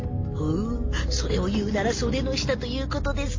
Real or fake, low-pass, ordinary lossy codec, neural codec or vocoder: real; 7.2 kHz; MP3, 32 kbps; none